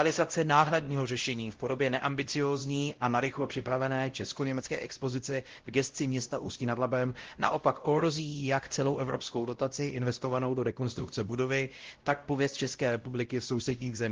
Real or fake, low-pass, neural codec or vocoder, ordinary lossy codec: fake; 7.2 kHz; codec, 16 kHz, 0.5 kbps, X-Codec, WavLM features, trained on Multilingual LibriSpeech; Opus, 16 kbps